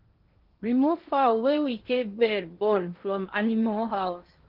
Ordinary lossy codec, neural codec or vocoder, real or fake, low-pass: Opus, 16 kbps; codec, 16 kHz in and 24 kHz out, 0.8 kbps, FocalCodec, streaming, 65536 codes; fake; 5.4 kHz